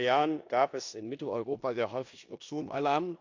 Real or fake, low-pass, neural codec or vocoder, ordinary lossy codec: fake; 7.2 kHz; codec, 16 kHz in and 24 kHz out, 0.9 kbps, LongCat-Audio-Codec, four codebook decoder; AAC, 48 kbps